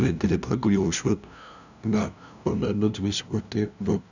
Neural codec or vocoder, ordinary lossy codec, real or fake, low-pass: codec, 16 kHz, 0.5 kbps, FunCodec, trained on LibriTTS, 25 frames a second; none; fake; 7.2 kHz